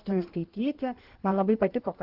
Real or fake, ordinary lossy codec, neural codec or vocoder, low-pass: fake; Opus, 16 kbps; codec, 16 kHz in and 24 kHz out, 1.1 kbps, FireRedTTS-2 codec; 5.4 kHz